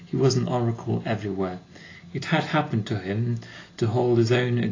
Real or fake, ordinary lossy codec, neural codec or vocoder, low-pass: real; AAC, 32 kbps; none; 7.2 kHz